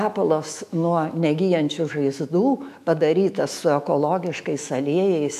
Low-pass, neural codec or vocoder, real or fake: 14.4 kHz; autoencoder, 48 kHz, 128 numbers a frame, DAC-VAE, trained on Japanese speech; fake